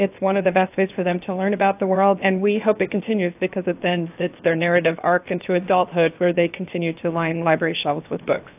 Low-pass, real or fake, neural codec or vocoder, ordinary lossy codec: 3.6 kHz; fake; codec, 16 kHz, 0.7 kbps, FocalCodec; AAC, 32 kbps